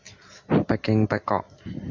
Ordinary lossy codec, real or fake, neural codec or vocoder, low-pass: MP3, 64 kbps; real; none; 7.2 kHz